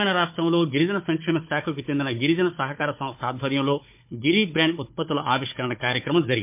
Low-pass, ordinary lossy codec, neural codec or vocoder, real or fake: 3.6 kHz; MP3, 32 kbps; codec, 16 kHz, 4 kbps, FunCodec, trained on Chinese and English, 50 frames a second; fake